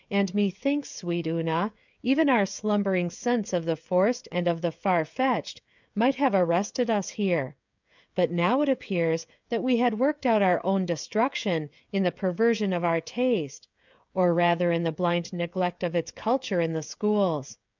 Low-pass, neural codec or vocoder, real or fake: 7.2 kHz; codec, 16 kHz, 16 kbps, FreqCodec, smaller model; fake